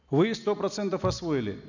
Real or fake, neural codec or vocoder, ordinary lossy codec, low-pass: real; none; none; 7.2 kHz